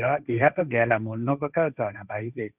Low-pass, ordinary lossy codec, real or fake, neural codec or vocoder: 3.6 kHz; none; fake; codec, 16 kHz, 1.1 kbps, Voila-Tokenizer